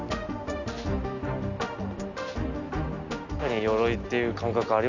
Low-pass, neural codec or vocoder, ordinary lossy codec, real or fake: 7.2 kHz; none; none; real